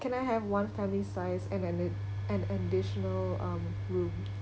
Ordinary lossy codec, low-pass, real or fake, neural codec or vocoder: none; none; real; none